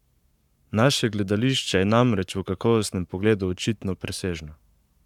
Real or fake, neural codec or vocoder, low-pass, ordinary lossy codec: fake; codec, 44.1 kHz, 7.8 kbps, Pupu-Codec; 19.8 kHz; none